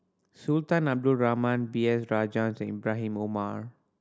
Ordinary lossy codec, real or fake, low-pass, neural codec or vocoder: none; real; none; none